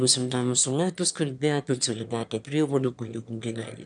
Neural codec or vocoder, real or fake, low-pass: autoencoder, 22.05 kHz, a latent of 192 numbers a frame, VITS, trained on one speaker; fake; 9.9 kHz